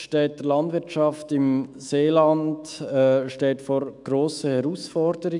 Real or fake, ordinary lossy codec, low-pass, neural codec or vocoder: fake; none; 10.8 kHz; autoencoder, 48 kHz, 128 numbers a frame, DAC-VAE, trained on Japanese speech